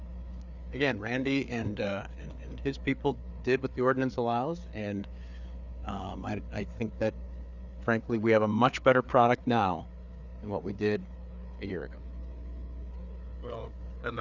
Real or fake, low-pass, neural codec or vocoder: fake; 7.2 kHz; codec, 16 kHz, 4 kbps, FreqCodec, larger model